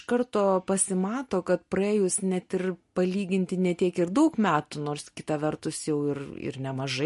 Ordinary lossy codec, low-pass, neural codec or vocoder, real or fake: MP3, 48 kbps; 14.4 kHz; none; real